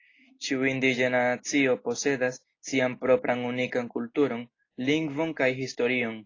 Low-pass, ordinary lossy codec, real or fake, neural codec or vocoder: 7.2 kHz; AAC, 32 kbps; real; none